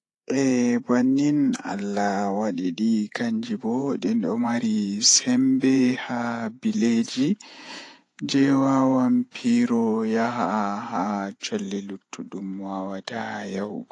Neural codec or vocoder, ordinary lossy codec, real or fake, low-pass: vocoder, 48 kHz, 128 mel bands, Vocos; AAC, 48 kbps; fake; 10.8 kHz